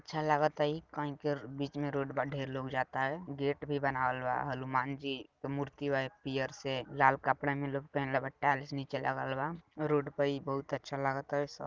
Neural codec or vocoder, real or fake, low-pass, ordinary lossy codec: none; real; 7.2 kHz; Opus, 32 kbps